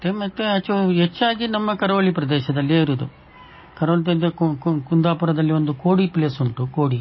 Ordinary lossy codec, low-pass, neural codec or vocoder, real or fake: MP3, 24 kbps; 7.2 kHz; none; real